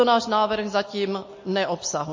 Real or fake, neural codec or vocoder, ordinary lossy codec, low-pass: real; none; MP3, 32 kbps; 7.2 kHz